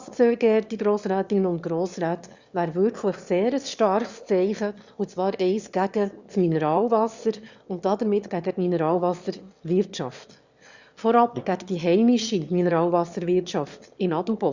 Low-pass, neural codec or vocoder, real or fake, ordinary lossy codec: 7.2 kHz; autoencoder, 22.05 kHz, a latent of 192 numbers a frame, VITS, trained on one speaker; fake; Opus, 64 kbps